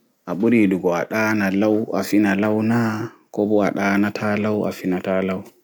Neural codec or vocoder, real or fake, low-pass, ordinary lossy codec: none; real; none; none